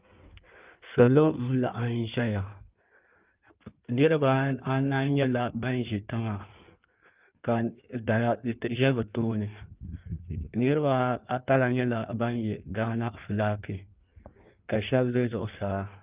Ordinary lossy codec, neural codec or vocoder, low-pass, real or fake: Opus, 24 kbps; codec, 16 kHz in and 24 kHz out, 1.1 kbps, FireRedTTS-2 codec; 3.6 kHz; fake